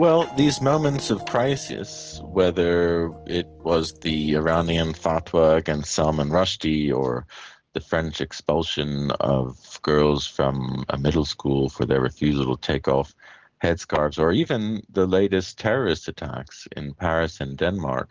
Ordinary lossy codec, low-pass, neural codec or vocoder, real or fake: Opus, 16 kbps; 7.2 kHz; none; real